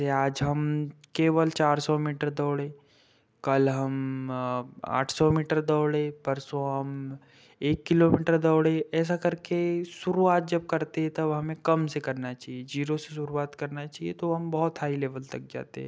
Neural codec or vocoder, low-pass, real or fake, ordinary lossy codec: none; none; real; none